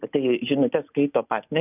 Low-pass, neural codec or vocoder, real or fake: 3.6 kHz; none; real